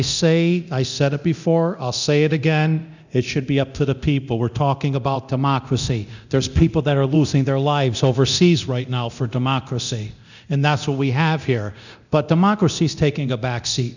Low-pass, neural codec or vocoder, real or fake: 7.2 kHz; codec, 24 kHz, 0.9 kbps, DualCodec; fake